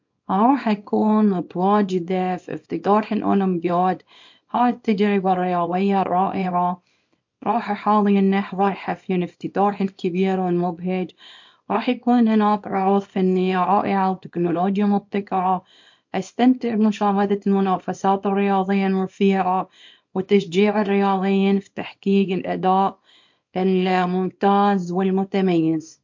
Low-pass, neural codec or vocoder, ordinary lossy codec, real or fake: 7.2 kHz; codec, 24 kHz, 0.9 kbps, WavTokenizer, small release; MP3, 48 kbps; fake